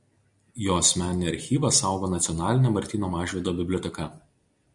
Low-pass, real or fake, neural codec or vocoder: 10.8 kHz; real; none